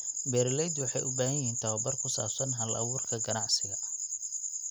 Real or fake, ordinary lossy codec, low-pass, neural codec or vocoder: real; none; 19.8 kHz; none